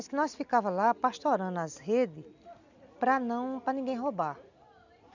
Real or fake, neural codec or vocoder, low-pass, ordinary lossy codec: real; none; 7.2 kHz; none